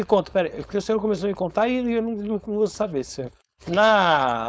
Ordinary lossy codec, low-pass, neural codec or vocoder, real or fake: none; none; codec, 16 kHz, 4.8 kbps, FACodec; fake